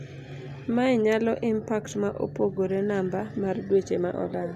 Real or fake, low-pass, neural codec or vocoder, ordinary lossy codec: real; 14.4 kHz; none; none